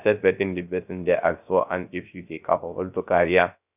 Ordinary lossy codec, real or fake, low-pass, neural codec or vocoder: none; fake; 3.6 kHz; codec, 16 kHz, 0.3 kbps, FocalCodec